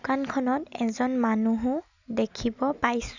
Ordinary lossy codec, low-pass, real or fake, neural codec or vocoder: none; 7.2 kHz; real; none